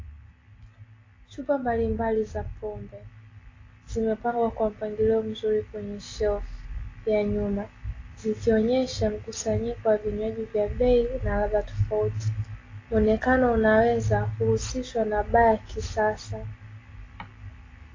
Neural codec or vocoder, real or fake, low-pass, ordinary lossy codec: none; real; 7.2 kHz; AAC, 32 kbps